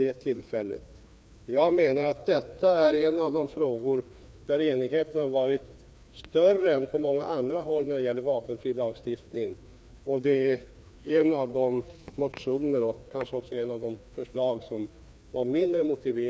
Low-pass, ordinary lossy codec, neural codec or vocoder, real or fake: none; none; codec, 16 kHz, 2 kbps, FreqCodec, larger model; fake